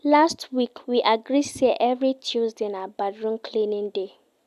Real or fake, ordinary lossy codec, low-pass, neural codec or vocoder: real; none; 14.4 kHz; none